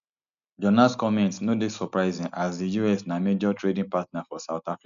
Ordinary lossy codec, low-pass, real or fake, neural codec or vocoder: none; 7.2 kHz; real; none